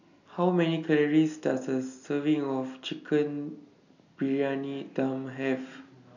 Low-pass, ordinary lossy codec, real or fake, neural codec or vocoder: 7.2 kHz; none; real; none